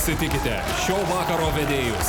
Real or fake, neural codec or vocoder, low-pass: real; none; 19.8 kHz